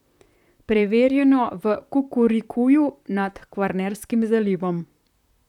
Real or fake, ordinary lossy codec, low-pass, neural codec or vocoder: fake; none; 19.8 kHz; vocoder, 44.1 kHz, 128 mel bands, Pupu-Vocoder